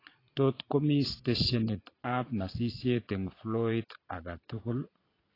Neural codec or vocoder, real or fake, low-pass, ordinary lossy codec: vocoder, 22.05 kHz, 80 mel bands, Vocos; fake; 5.4 kHz; AAC, 24 kbps